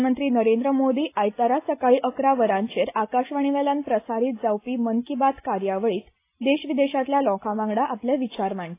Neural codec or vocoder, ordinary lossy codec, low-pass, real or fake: none; AAC, 24 kbps; 3.6 kHz; real